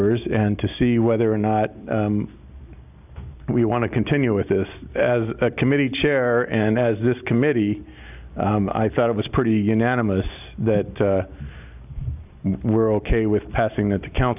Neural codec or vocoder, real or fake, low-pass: none; real; 3.6 kHz